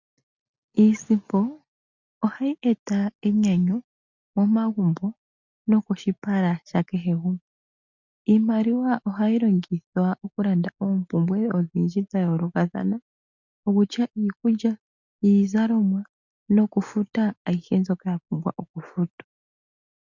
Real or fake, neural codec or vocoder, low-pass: real; none; 7.2 kHz